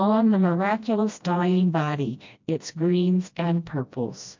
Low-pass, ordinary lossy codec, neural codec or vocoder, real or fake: 7.2 kHz; MP3, 48 kbps; codec, 16 kHz, 1 kbps, FreqCodec, smaller model; fake